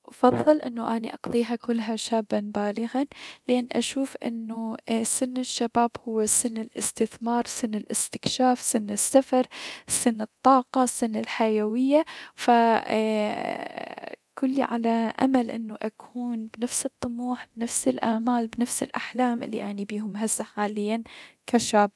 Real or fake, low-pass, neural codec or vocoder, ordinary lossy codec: fake; none; codec, 24 kHz, 0.9 kbps, DualCodec; none